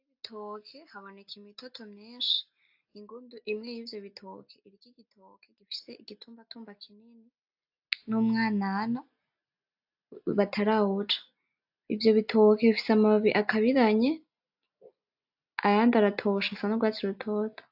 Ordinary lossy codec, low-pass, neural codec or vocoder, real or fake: AAC, 48 kbps; 5.4 kHz; none; real